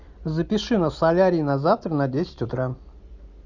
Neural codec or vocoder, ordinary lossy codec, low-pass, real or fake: none; Opus, 64 kbps; 7.2 kHz; real